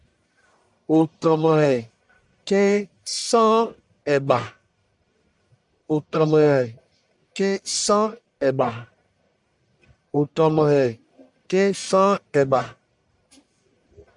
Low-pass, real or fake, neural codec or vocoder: 10.8 kHz; fake; codec, 44.1 kHz, 1.7 kbps, Pupu-Codec